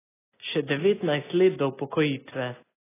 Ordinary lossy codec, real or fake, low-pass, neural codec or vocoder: AAC, 16 kbps; real; 3.6 kHz; none